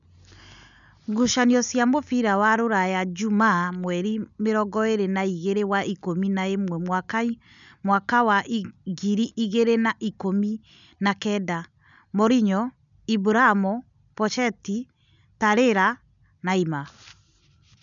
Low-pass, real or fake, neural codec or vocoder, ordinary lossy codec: 7.2 kHz; real; none; none